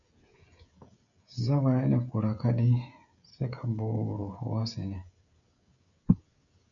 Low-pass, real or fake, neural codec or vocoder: 7.2 kHz; fake; codec, 16 kHz, 16 kbps, FreqCodec, smaller model